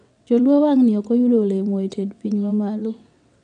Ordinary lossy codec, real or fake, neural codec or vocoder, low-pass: none; fake; vocoder, 22.05 kHz, 80 mel bands, WaveNeXt; 9.9 kHz